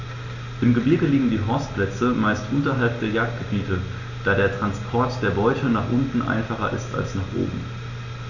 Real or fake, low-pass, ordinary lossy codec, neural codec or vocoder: real; 7.2 kHz; none; none